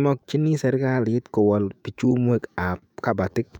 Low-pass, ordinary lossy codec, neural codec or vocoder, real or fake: 19.8 kHz; none; autoencoder, 48 kHz, 128 numbers a frame, DAC-VAE, trained on Japanese speech; fake